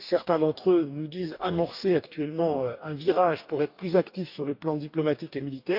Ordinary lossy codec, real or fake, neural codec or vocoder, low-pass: none; fake; codec, 44.1 kHz, 2.6 kbps, DAC; 5.4 kHz